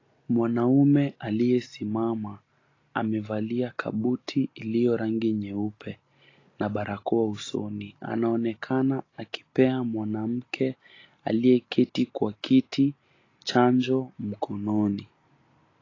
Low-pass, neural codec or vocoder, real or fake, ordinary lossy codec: 7.2 kHz; none; real; AAC, 32 kbps